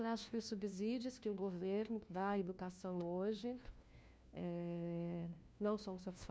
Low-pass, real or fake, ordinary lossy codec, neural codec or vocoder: none; fake; none; codec, 16 kHz, 1 kbps, FunCodec, trained on LibriTTS, 50 frames a second